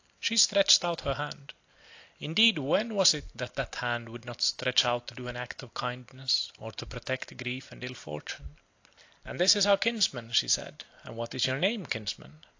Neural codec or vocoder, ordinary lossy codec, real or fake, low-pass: none; AAC, 48 kbps; real; 7.2 kHz